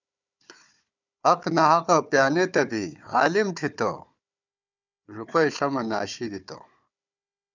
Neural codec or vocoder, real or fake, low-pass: codec, 16 kHz, 4 kbps, FunCodec, trained on Chinese and English, 50 frames a second; fake; 7.2 kHz